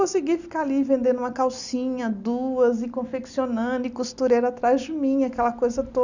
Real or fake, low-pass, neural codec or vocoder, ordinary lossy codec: real; 7.2 kHz; none; none